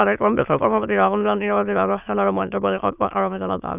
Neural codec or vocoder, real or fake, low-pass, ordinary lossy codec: autoencoder, 22.05 kHz, a latent of 192 numbers a frame, VITS, trained on many speakers; fake; 3.6 kHz; none